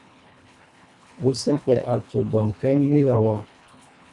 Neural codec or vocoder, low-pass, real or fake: codec, 24 kHz, 1.5 kbps, HILCodec; 10.8 kHz; fake